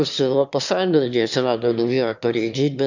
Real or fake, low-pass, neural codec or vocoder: fake; 7.2 kHz; autoencoder, 22.05 kHz, a latent of 192 numbers a frame, VITS, trained on one speaker